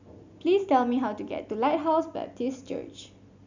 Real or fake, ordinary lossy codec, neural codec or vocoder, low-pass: real; none; none; 7.2 kHz